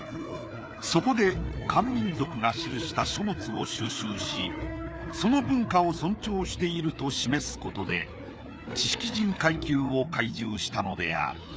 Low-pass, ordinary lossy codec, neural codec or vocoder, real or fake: none; none; codec, 16 kHz, 4 kbps, FreqCodec, larger model; fake